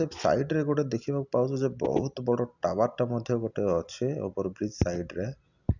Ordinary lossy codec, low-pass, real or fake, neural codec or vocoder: none; 7.2 kHz; real; none